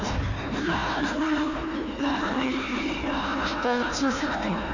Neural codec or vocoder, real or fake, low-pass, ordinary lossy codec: codec, 16 kHz, 1 kbps, FunCodec, trained on Chinese and English, 50 frames a second; fake; 7.2 kHz; none